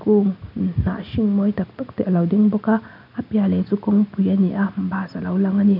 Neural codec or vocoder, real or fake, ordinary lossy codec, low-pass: none; real; none; 5.4 kHz